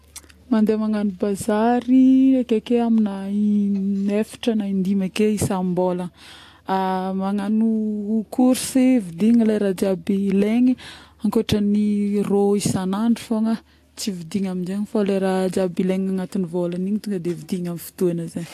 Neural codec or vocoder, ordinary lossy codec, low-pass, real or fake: none; AAC, 64 kbps; 14.4 kHz; real